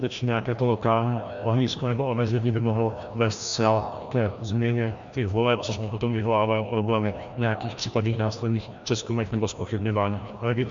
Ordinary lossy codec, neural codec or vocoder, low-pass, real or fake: MP3, 64 kbps; codec, 16 kHz, 1 kbps, FreqCodec, larger model; 7.2 kHz; fake